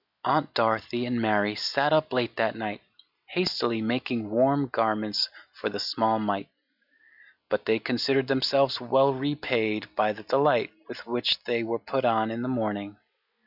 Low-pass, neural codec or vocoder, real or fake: 5.4 kHz; none; real